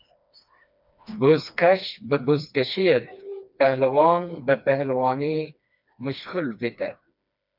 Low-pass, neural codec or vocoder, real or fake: 5.4 kHz; codec, 16 kHz, 2 kbps, FreqCodec, smaller model; fake